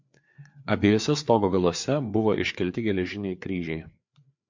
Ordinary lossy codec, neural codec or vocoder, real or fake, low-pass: MP3, 48 kbps; codec, 16 kHz, 4 kbps, FreqCodec, larger model; fake; 7.2 kHz